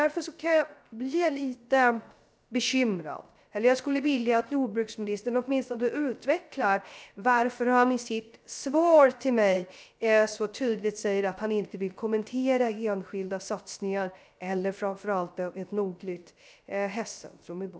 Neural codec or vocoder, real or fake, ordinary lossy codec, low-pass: codec, 16 kHz, 0.3 kbps, FocalCodec; fake; none; none